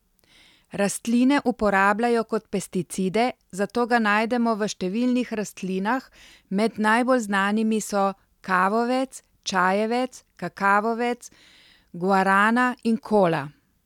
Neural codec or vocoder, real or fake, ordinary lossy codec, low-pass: none; real; none; 19.8 kHz